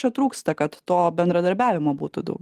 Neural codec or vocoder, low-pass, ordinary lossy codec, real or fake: none; 14.4 kHz; Opus, 24 kbps; real